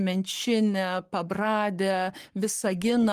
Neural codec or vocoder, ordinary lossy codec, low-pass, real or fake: codec, 44.1 kHz, 7.8 kbps, Pupu-Codec; Opus, 24 kbps; 14.4 kHz; fake